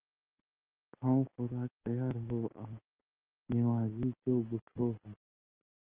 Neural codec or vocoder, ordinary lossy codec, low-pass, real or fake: none; Opus, 16 kbps; 3.6 kHz; real